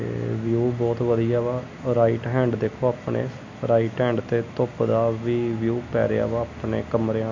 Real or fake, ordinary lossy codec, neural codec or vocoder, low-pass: real; MP3, 48 kbps; none; 7.2 kHz